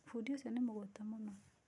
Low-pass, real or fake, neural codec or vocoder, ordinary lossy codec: 10.8 kHz; real; none; none